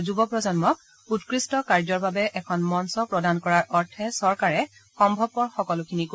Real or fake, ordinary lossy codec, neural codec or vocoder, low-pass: real; none; none; none